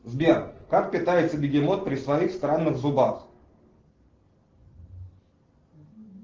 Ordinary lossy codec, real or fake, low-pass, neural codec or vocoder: Opus, 16 kbps; real; 7.2 kHz; none